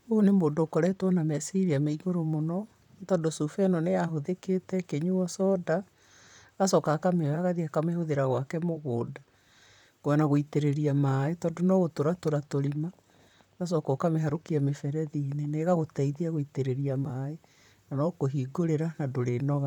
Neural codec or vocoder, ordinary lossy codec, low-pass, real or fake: vocoder, 44.1 kHz, 128 mel bands, Pupu-Vocoder; none; 19.8 kHz; fake